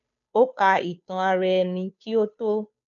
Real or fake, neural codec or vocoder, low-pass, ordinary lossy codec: fake; codec, 16 kHz, 2 kbps, FunCodec, trained on Chinese and English, 25 frames a second; 7.2 kHz; none